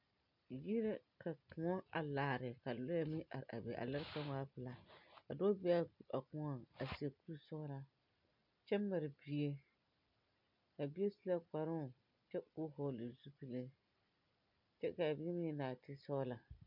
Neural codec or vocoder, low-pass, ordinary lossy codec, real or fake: none; 5.4 kHz; MP3, 48 kbps; real